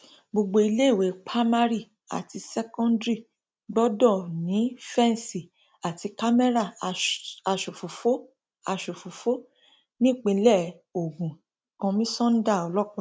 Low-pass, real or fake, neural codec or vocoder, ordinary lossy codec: none; real; none; none